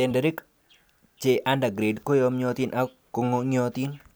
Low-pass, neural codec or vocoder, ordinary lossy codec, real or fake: none; none; none; real